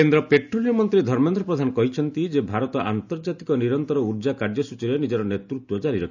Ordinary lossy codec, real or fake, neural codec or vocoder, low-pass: none; real; none; 7.2 kHz